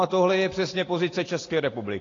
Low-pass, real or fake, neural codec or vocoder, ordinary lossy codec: 7.2 kHz; real; none; AAC, 32 kbps